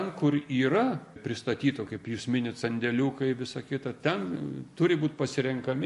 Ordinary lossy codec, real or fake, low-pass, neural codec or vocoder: MP3, 48 kbps; real; 14.4 kHz; none